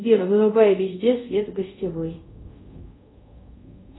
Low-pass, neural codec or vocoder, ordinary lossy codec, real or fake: 7.2 kHz; codec, 24 kHz, 0.5 kbps, DualCodec; AAC, 16 kbps; fake